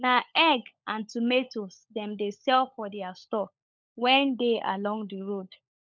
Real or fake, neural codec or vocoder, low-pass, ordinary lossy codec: fake; codec, 16 kHz, 16 kbps, FunCodec, trained on LibriTTS, 50 frames a second; none; none